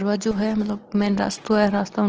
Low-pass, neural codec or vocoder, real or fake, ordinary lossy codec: 7.2 kHz; none; real; Opus, 16 kbps